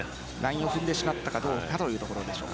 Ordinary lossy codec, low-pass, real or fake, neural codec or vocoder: none; none; real; none